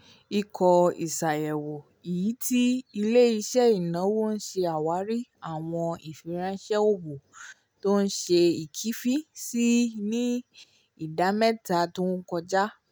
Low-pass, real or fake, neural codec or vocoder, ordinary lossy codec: none; real; none; none